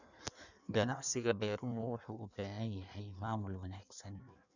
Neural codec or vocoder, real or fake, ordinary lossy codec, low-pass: codec, 16 kHz in and 24 kHz out, 1.1 kbps, FireRedTTS-2 codec; fake; none; 7.2 kHz